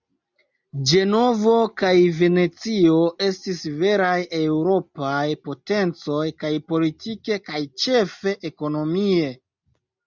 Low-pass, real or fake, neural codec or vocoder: 7.2 kHz; real; none